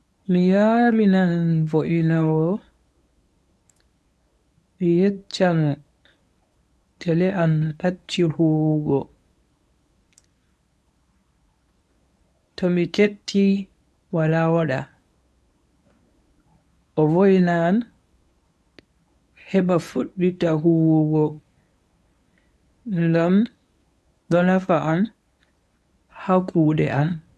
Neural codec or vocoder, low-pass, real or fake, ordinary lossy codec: codec, 24 kHz, 0.9 kbps, WavTokenizer, medium speech release version 1; none; fake; none